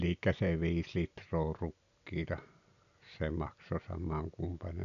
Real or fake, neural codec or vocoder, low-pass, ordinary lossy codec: real; none; 7.2 kHz; none